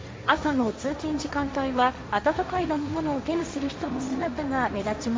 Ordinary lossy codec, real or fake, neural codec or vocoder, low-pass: none; fake; codec, 16 kHz, 1.1 kbps, Voila-Tokenizer; none